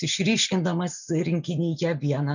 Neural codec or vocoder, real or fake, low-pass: none; real; 7.2 kHz